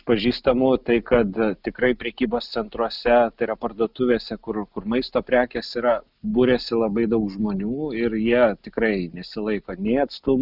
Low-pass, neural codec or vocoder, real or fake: 5.4 kHz; none; real